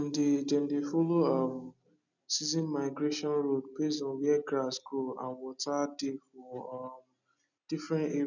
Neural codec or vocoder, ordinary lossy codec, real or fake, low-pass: none; none; real; 7.2 kHz